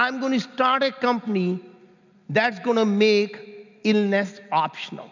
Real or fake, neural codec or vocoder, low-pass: real; none; 7.2 kHz